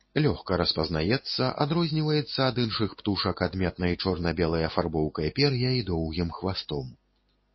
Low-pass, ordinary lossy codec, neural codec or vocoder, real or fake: 7.2 kHz; MP3, 24 kbps; none; real